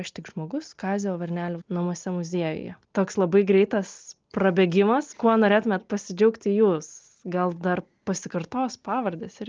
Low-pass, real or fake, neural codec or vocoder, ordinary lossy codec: 7.2 kHz; real; none; Opus, 24 kbps